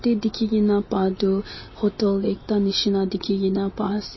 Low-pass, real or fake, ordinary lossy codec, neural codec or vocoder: 7.2 kHz; real; MP3, 24 kbps; none